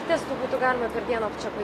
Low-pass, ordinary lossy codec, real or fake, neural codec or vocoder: 14.4 kHz; AAC, 48 kbps; real; none